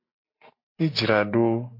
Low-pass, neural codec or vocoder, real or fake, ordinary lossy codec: 5.4 kHz; none; real; MP3, 32 kbps